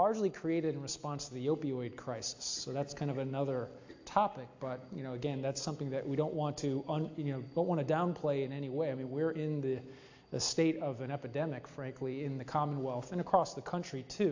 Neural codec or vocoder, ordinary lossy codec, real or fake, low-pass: none; AAC, 48 kbps; real; 7.2 kHz